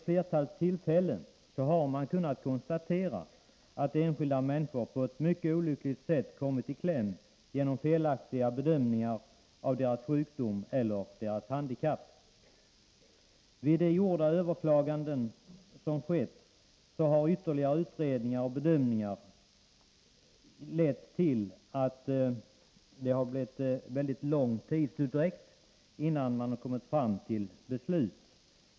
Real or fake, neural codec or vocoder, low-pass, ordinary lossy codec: real; none; none; none